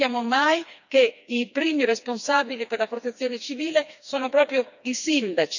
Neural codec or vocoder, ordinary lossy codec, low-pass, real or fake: codec, 16 kHz, 2 kbps, FreqCodec, smaller model; none; 7.2 kHz; fake